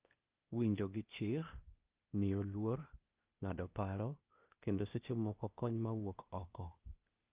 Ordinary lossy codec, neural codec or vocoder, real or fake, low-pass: Opus, 24 kbps; codec, 16 kHz, 0.8 kbps, ZipCodec; fake; 3.6 kHz